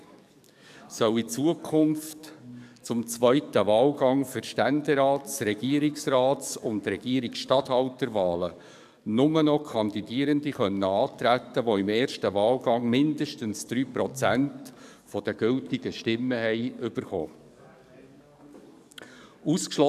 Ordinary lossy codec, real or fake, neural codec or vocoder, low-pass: none; fake; codec, 44.1 kHz, 7.8 kbps, DAC; 14.4 kHz